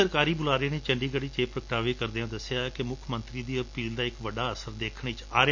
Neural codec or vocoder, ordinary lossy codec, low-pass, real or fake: none; none; 7.2 kHz; real